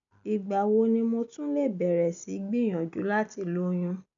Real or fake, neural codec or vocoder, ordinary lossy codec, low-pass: real; none; none; 7.2 kHz